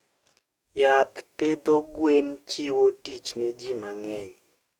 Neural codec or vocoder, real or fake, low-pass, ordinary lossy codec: codec, 44.1 kHz, 2.6 kbps, DAC; fake; 19.8 kHz; none